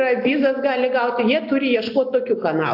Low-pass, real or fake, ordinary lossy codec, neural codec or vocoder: 5.4 kHz; real; AAC, 48 kbps; none